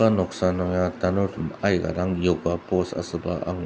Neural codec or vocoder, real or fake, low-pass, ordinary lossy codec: none; real; none; none